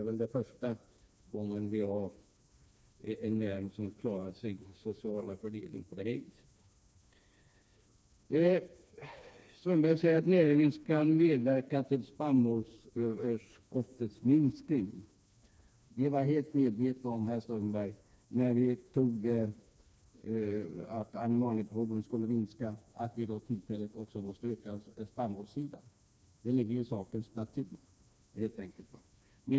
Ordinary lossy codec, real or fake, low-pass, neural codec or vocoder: none; fake; none; codec, 16 kHz, 2 kbps, FreqCodec, smaller model